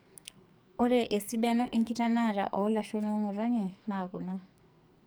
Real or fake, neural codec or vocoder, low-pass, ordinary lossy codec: fake; codec, 44.1 kHz, 2.6 kbps, SNAC; none; none